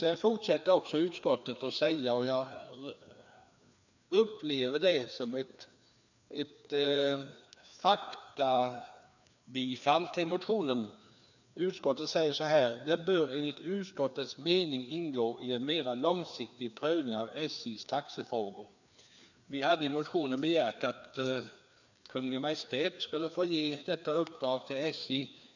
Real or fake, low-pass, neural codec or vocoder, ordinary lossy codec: fake; 7.2 kHz; codec, 16 kHz, 2 kbps, FreqCodec, larger model; none